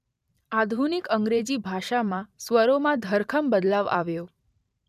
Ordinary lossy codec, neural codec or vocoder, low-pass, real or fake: none; vocoder, 44.1 kHz, 128 mel bands every 512 samples, BigVGAN v2; 14.4 kHz; fake